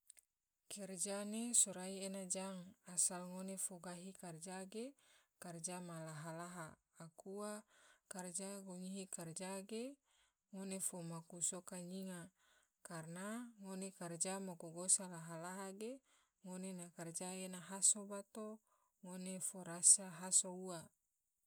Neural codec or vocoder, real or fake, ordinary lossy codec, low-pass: none; real; none; none